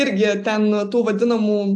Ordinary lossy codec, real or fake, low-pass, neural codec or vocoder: AAC, 64 kbps; real; 10.8 kHz; none